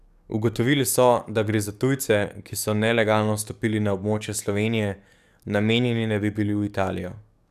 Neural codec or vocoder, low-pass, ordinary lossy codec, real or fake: codec, 44.1 kHz, 7.8 kbps, DAC; 14.4 kHz; none; fake